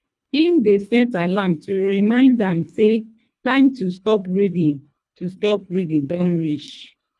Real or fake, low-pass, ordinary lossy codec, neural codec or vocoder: fake; none; none; codec, 24 kHz, 1.5 kbps, HILCodec